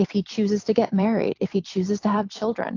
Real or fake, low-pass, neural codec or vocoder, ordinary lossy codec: fake; 7.2 kHz; vocoder, 44.1 kHz, 128 mel bands every 256 samples, BigVGAN v2; AAC, 48 kbps